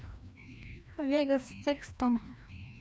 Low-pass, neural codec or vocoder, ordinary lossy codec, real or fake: none; codec, 16 kHz, 1 kbps, FreqCodec, larger model; none; fake